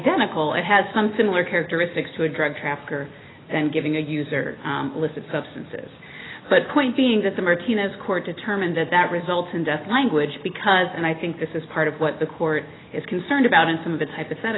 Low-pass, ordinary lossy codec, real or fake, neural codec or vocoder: 7.2 kHz; AAC, 16 kbps; real; none